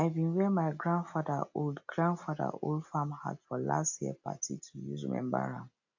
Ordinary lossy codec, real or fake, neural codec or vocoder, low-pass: AAC, 48 kbps; real; none; 7.2 kHz